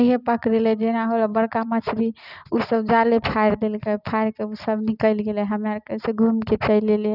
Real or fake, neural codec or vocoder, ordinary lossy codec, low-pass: real; none; none; 5.4 kHz